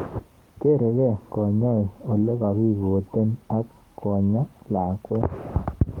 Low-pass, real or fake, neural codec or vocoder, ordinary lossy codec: 19.8 kHz; fake; codec, 44.1 kHz, 7.8 kbps, Pupu-Codec; Opus, 24 kbps